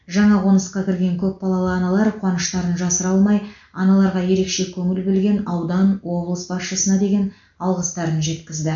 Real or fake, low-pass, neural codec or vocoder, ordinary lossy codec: real; 7.2 kHz; none; MP3, 64 kbps